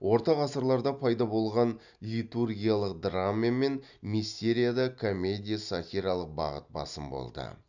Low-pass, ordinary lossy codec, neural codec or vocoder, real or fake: 7.2 kHz; none; none; real